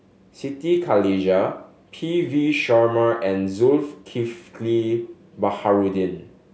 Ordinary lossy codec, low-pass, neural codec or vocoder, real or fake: none; none; none; real